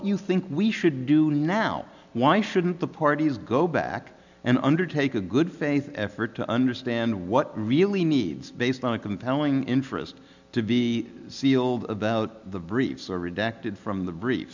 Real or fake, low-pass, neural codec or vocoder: real; 7.2 kHz; none